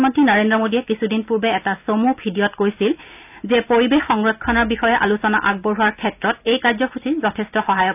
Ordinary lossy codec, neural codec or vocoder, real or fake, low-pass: none; none; real; 3.6 kHz